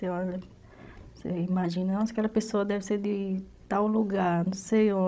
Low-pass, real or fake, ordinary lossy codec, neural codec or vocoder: none; fake; none; codec, 16 kHz, 16 kbps, FreqCodec, larger model